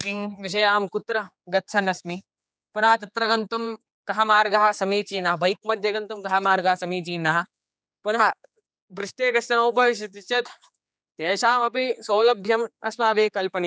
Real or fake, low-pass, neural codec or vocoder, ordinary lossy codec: fake; none; codec, 16 kHz, 4 kbps, X-Codec, HuBERT features, trained on general audio; none